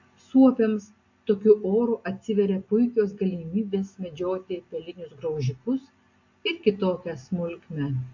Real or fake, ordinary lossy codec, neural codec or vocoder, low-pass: real; AAC, 48 kbps; none; 7.2 kHz